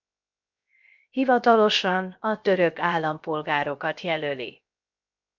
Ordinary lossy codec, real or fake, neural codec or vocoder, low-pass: MP3, 64 kbps; fake; codec, 16 kHz, 0.7 kbps, FocalCodec; 7.2 kHz